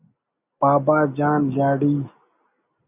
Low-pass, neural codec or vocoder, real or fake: 3.6 kHz; none; real